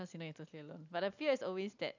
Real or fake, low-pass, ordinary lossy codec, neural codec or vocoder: real; 7.2 kHz; none; none